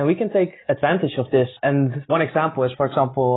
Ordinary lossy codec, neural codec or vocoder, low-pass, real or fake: AAC, 16 kbps; none; 7.2 kHz; real